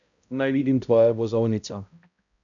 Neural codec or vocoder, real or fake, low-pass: codec, 16 kHz, 0.5 kbps, X-Codec, HuBERT features, trained on balanced general audio; fake; 7.2 kHz